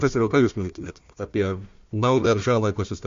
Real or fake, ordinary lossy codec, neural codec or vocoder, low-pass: fake; MP3, 48 kbps; codec, 16 kHz, 1 kbps, FunCodec, trained on Chinese and English, 50 frames a second; 7.2 kHz